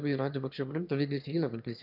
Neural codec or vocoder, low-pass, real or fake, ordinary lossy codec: autoencoder, 22.05 kHz, a latent of 192 numbers a frame, VITS, trained on one speaker; 5.4 kHz; fake; none